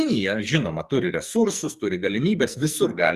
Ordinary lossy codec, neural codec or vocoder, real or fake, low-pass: Opus, 64 kbps; codec, 44.1 kHz, 2.6 kbps, SNAC; fake; 14.4 kHz